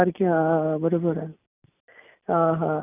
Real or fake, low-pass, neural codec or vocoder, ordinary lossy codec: real; 3.6 kHz; none; none